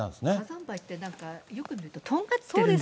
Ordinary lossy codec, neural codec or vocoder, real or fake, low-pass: none; none; real; none